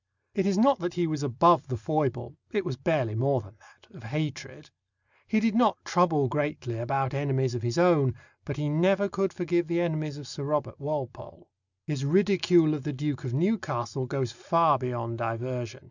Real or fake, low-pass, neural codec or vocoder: real; 7.2 kHz; none